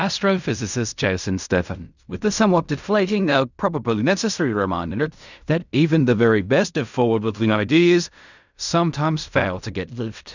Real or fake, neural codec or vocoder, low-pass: fake; codec, 16 kHz in and 24 kHz out, 0.4 kbps, LongCat-Audio-Codec, fine tuned four codebook decoder; 7.2 kHz